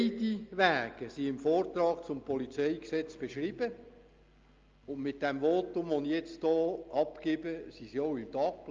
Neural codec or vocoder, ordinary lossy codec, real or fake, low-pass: none; Opus, 24 kbps; real; 7.2 kHz